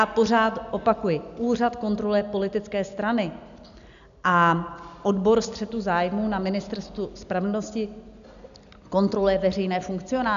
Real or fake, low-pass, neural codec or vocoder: real; 7.2 kHz; none